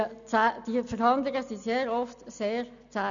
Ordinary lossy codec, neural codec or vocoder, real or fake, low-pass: none; none; real; 7.2 kHz